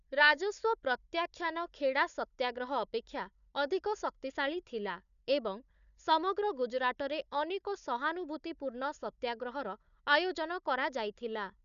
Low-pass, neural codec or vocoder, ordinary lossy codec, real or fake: 7.2 kHz; codec, 16 kHz, 4 kbps, FunCodec, trained on Chinese and English, 50 frames a second; none; fake